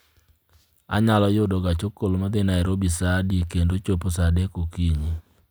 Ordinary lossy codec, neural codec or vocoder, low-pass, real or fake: none; none; none; real